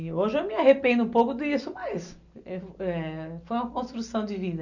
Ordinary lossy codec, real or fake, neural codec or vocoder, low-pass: none; real; none; 7.2 kHz